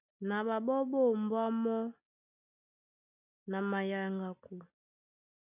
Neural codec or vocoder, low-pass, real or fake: none; 3.6 kHz; real